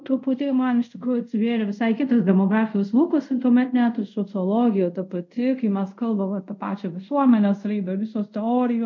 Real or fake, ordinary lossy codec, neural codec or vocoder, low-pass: fake; MP3, 48 kbps; codec, 24 kHz, 0.5 kbps, DualCodec; 7.2 kHz